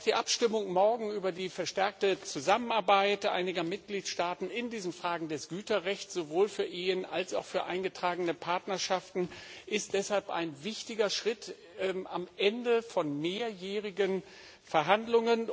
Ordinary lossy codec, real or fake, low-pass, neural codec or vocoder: none; real; none; none